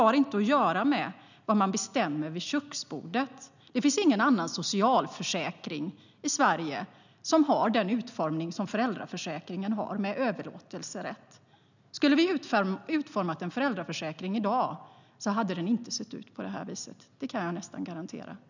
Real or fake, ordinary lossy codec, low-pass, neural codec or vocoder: real; none; 7.2 kHz; none